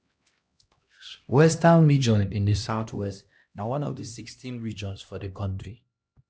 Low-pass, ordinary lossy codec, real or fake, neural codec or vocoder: none; none; fake; codec, 16 kHz, 1 kbps, X-Codec, HuBERT features, trained on LibriSpeech